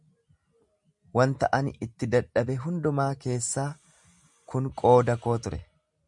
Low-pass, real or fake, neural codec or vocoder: 10.8 kHz; real; none